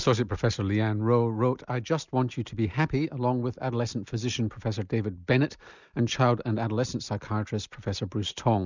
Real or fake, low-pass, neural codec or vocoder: real; 7.2 kHz; none